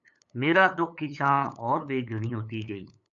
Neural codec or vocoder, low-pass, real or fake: codec, 16 kHz, 8 kbps, FunCodec, trained on LibriTTS, 25 frames a second; 7.2 kHz; fake